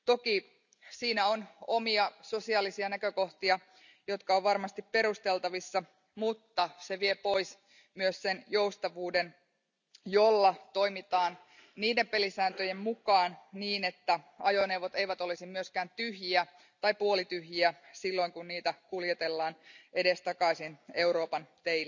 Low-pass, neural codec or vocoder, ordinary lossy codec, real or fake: 7.2 kHz; none; none; real